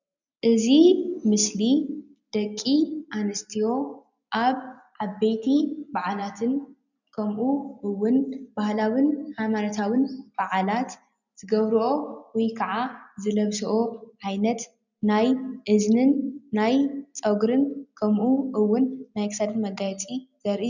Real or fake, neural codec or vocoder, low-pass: real; none; 7.2 kHz